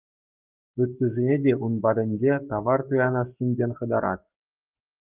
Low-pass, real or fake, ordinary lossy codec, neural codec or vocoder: 3.6 kHz; fake; Opus, 32 kbps; codec, 44.1 kHz, 7.8 kbps, Pupu-Codec